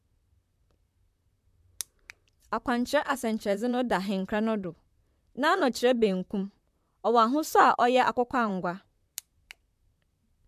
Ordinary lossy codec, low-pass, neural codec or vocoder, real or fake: MP3, 96 kbps; 14.4 kHz; vocoder, 44.1 kHz, 128 mel bands, Pupu-Vocoder; fake